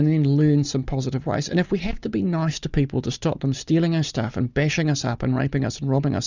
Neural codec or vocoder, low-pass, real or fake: none; 7.2 kHz; real